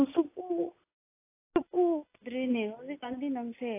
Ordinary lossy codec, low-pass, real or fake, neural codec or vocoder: AAC, 24 kbps; 3.6 kHz; real; none